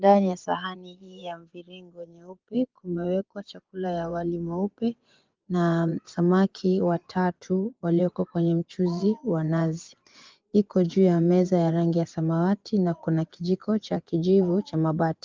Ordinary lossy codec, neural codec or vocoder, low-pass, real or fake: Opus, 32 kbps; vocoder, 24 kHz, 100 mel bands, Vocos; 7.2 kHz; fake